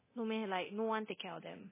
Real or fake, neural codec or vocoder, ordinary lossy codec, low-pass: fake; codec, 24 kHz, 0.9 kbps, DualCodec; MP3, 16 kbps; 3.6 kHz